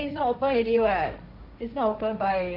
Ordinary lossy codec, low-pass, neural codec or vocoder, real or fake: AAC, 48 kbps; 5.4 kHz; codec, 16 kHz, 1.1 kbps, Voila-Tokenizer; fake